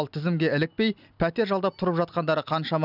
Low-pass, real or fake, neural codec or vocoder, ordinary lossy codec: 5.4 kHz; real; none; none